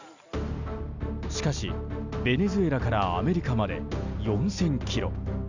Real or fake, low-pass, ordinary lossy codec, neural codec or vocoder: real; 7.2 kHz; none; none